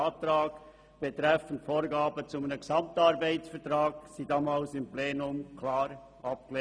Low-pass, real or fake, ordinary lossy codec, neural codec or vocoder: none; real; none; none